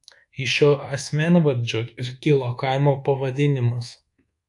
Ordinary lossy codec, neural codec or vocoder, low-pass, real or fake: AAC, 64 kbps; codec, 24 kHz, 1.2 kbps, DualCodec; 10.8 kHz; fake